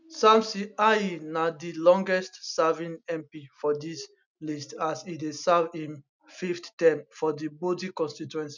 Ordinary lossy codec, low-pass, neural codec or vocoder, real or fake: none; 7.2 kHz; none; real